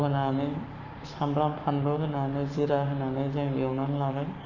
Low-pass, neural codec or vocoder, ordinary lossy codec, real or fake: 7.2 kHz; codec, 44.1 kHz, 7.8 kbps, Pupu-Codec; none; fake